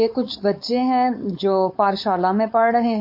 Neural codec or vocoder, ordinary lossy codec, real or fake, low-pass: codec, 16 kHz, 4.8 kbps, FACodec; MP3, 32 kbps; fake; 5.4 kHz